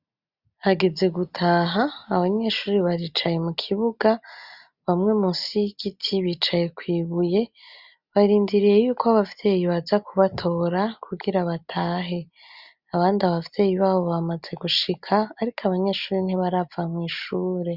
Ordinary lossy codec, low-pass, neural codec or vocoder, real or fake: Opus, 64 kbps; 5.4 kHz; none; real